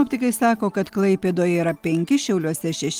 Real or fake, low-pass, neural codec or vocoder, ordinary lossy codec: real; 19.8 kHz; none; Opus, 24 kbps